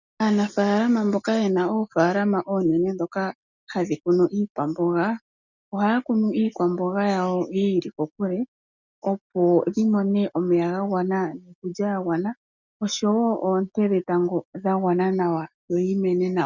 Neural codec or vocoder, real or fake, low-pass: none; real; 7.2 kHz